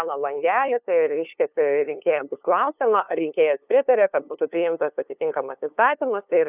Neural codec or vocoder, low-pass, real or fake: codec, 16 kHz, 2 kbps, FunCodec, trained on LibriTTS, 25 frames a second; 3.6 kHz; fake